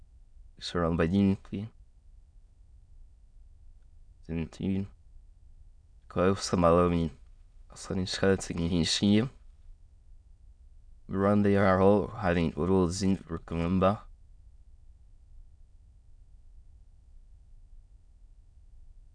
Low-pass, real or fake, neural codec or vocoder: 9.9 kHz; fake; autoencoder, 22.05 kHz, a latent of 192 numbers a frame, VITS, trained on many speakers